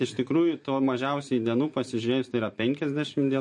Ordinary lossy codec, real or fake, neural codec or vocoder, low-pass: MP3, 48 kbps; fake; vocoder, 44.1 kHz, 128 mel bands every 512 samples, BigVGAN v2; 10.8 kHz